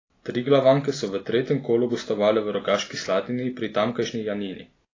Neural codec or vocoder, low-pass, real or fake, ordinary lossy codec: none; 7.2 kHz; real; AAC, 32 kbps